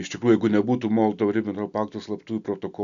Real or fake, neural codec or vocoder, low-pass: real; none; 7.2 kHz